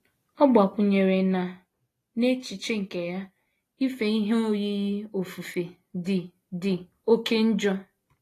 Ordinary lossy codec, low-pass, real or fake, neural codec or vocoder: AAC, 48 kbps; 14.4 kHz; real; none